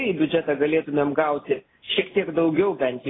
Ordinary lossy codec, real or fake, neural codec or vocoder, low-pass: AAC, 16 kbps; real; none; 7.2 kHz